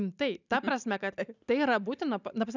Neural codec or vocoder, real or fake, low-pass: none; real; 7.2 kHz